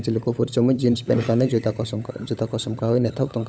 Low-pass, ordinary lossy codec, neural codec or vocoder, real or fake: none; none; codec, 16 kHz, 16 kbps, FunCodec, trained on LibriTTS, 50 frames a second; fake